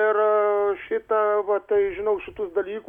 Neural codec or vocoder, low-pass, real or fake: none; 19.8 kHz; real